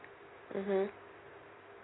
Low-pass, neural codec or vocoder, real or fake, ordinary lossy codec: 7.2 kHz; none; real; AAC, 16 kbps